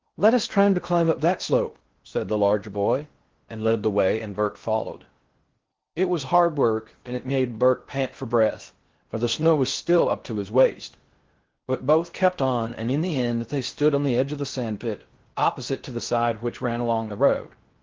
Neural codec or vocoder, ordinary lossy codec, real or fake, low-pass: codec, 16 kHz in and 24 kHz out, 0.6 kbps, FocalCodec, streaming, 4096 codes; Opus, 16 kbps; fake; 7.2 kHz